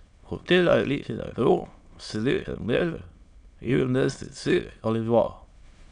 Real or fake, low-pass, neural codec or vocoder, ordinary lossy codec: fake; 9.9 kHz; autoencoder, 22.05 kHz, a latent of 192 numbers a frame, VITS, trained on many speakers; none